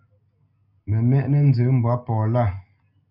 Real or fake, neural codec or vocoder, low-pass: real; none; 5.4 kHz